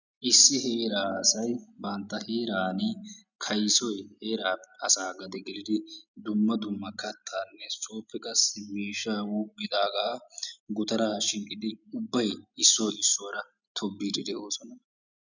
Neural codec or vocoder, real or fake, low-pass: none; real; 7.2 kHz